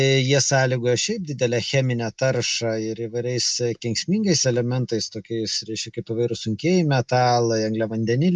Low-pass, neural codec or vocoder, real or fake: 10.8 kHz; none; real